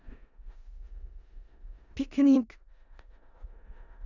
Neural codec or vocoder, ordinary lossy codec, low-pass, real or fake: codec, 16 kHz in and 24 kHz out, 0.4 kbps, LongCat-Audio-Codec, four codebook decoder; none; 7.2 kHz; fake